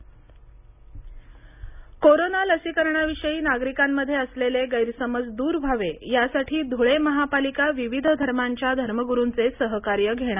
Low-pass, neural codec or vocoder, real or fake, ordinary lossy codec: 3.6 kHz; none; real; none